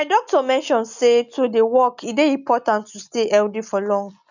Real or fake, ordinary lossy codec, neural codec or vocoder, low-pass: real; none; none; 7.2 kHz